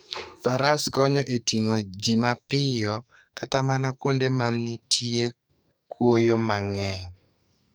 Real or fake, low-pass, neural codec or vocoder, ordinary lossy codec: fake; none; codec, 44.1 kHz, 2.6 kbps, SNAC; none